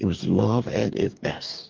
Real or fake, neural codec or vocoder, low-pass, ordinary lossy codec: fake; codec, 44.1 kHz, 2.6 kbps, DAC; 7.2 kHz; Opus, 32 kbps